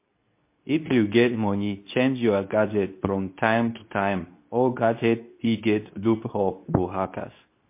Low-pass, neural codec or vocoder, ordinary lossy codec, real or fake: 3.6 kHz; codec, 24 kHz, 0.9 kbps, WavTokenizer, medium speech release version 2; MP3, 24 kbps; fake